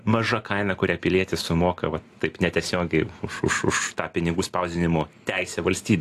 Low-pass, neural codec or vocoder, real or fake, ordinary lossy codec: 14.4 kHz; none; real; AAC, 64 kbps